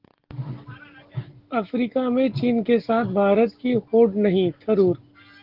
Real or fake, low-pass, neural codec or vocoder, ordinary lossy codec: real; 5.4 kHz; none; Opus, 16 kbps